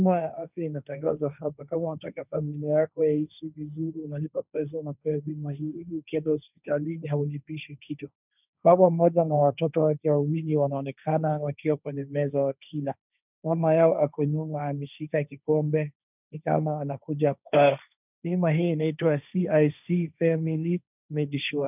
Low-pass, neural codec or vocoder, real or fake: 3.6 kHz; codec, 16 kHz, 1.1 kbps, Voila-Tokenizer; fake